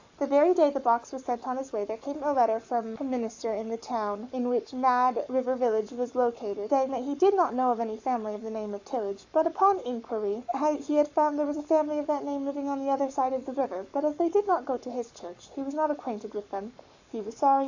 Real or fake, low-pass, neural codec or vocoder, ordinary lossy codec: fake; 7.2 kHz; codec, 44.1 kHz, 7.8 kbps, Pupu-Codec; AAC, 48 kbps